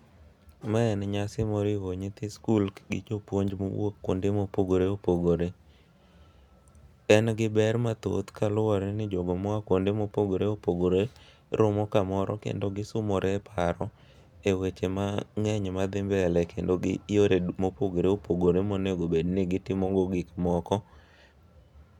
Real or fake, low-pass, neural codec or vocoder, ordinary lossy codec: real; 19.8 kHz; none; none